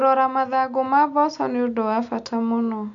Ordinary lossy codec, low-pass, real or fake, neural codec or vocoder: MP3, 64 kbps; 7.2 kHz; real; none